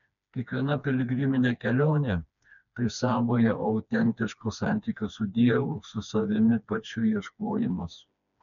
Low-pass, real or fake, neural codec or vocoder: 7.2 kHz; fake; codec, 16 kHz, 2 kbps, FreqCodec, smaller model